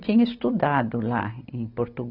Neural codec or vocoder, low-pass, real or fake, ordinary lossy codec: none; 5.4 kHz; real; none